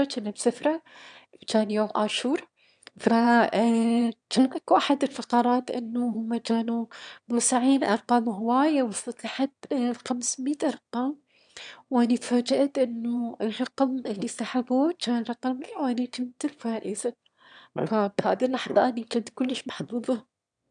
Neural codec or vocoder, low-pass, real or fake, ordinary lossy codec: autoencoder, 22.05 kHz, a latent of 192 numbers a frame, VITS, trained on one speaker; 9.9 kHz; fake; none